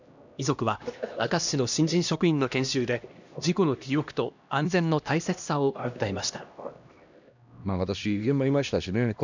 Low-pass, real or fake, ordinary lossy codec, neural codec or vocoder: 7.2 kHz; fake; none; codec, 16 kHz, 1 kbps, X-Codec, HuBERT features, trained on LibriSpeech